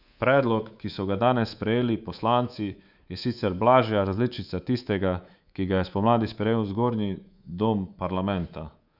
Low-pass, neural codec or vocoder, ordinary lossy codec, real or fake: 5.4 kHz; codec, 24 kHz, 3.1 kbps, DualCodec; Opus, 64 kbps; fake